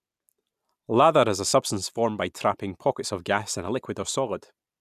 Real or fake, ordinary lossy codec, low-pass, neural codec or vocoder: real; none; 14.4 kHz; none